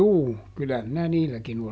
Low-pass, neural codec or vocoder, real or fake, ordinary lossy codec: none; none; real; none